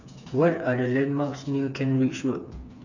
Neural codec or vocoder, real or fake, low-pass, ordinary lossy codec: codec, 16 kHz, 4 kbps, FreqCodec, smaller model; fake; 7.2 kHz; none